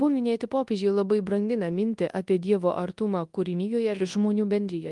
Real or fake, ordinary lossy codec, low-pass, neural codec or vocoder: fake; Opus, 24 kbps; 10.8 kHz; codec, 24 kHz, 0.9 kbps, WavTokenizer, large speech release